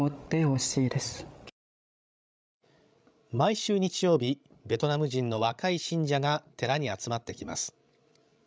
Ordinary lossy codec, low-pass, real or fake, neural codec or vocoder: none; none; fake; codec, 16 kHz, 16 kbps, FreqCodec, larger model